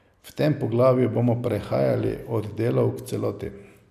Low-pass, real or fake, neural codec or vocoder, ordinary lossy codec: 14.4 kHz; real; none; none